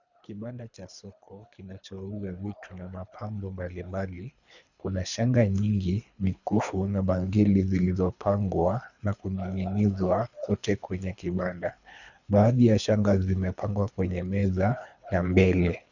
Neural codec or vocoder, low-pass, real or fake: codec, 24 kHz, 3 kbps, HILCodec; 7.2 kHz; fake